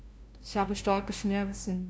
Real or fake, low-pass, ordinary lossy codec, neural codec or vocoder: fake; none; none; codec, 16 kHz, 0.5 kbps, FunCodec, trained on LibriTTS, 25 frames a second